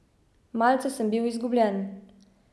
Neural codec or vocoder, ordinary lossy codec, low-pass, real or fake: none; none; none; real